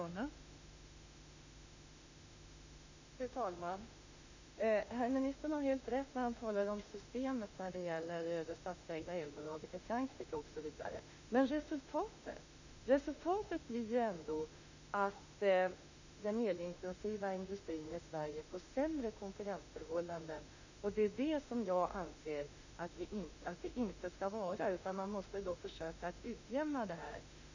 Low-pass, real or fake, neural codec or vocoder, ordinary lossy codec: 7.2 kHz; fake; autoencoder, 48 kHz, 32 numbers a frame, DAC-VAE, trained on Japanese speech; none